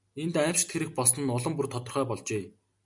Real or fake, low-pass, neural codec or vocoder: real; 10.8 kHz; none